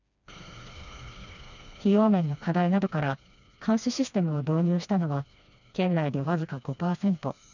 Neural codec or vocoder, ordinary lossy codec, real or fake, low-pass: codec, 16 kHz, 2 kbps, FreqCodec, smaller model; none; fake; 7.2 kHz